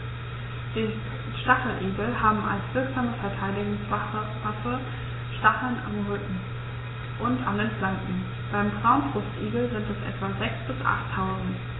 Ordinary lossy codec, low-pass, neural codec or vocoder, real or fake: AAC, 16 kbps; 7.2 kHz; none; real